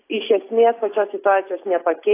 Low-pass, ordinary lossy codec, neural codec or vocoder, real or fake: 3.6 kHz; AAC, 24 kbps; none; real